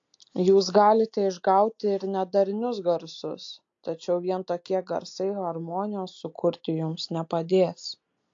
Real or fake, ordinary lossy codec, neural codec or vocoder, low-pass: real; AAC, 48 kbps; none; 7.2 kHz